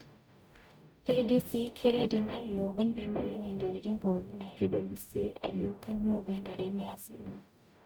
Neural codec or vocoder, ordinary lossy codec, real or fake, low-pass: codec, 44.1 kHz, 0.9 kbps, DAC; none; fake; none